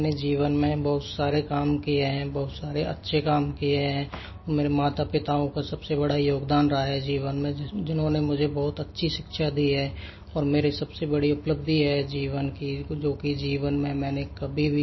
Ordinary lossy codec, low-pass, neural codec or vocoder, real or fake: MP3, 24 kbps; 7.2 kHz; none; real